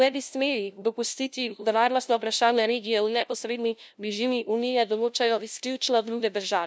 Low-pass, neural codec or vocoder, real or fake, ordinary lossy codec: none; codec, 16 kHz, 0.5 kbps, FunCodec, trained on LibriTTS, 25 frames a second; fake; none